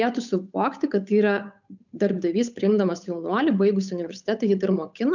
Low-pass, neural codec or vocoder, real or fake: 7.2 kHz; codec, 16 kHz, 8 kbps, FunCodec, trained on Chinese and English, 25 frames a second; fake